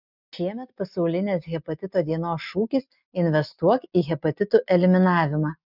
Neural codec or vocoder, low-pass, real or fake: none; 5.4 kHz; real